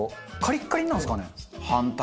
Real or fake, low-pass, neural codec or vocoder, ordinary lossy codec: real; none; none; none